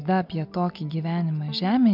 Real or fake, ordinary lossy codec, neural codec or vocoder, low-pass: real; MP3, 48 kbps; none; 5.4 kHz